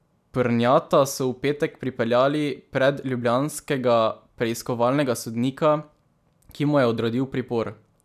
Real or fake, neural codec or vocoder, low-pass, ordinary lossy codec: real; none; 14.4 kHz; none